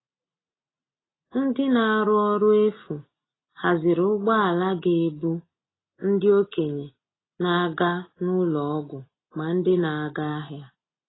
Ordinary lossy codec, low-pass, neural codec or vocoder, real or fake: AAC, 16 kbps; 7.2 kHz; none; real